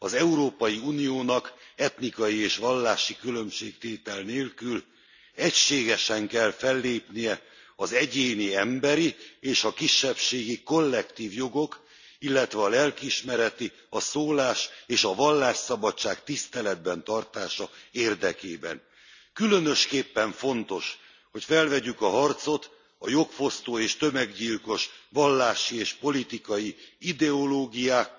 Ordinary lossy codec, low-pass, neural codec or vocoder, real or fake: none; 7.2 kHz; none; real